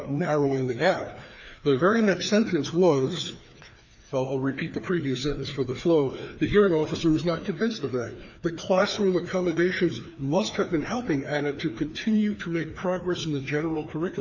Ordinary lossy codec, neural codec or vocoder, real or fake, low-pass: AAC, 48 kbps; codec, 16 kHz, 2 kbps, FreqCodec, larger model; fake; 7.2 kHz